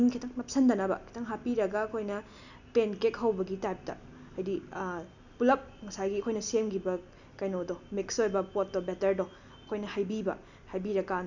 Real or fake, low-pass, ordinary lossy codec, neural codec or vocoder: real; 7.2 kHz; none; none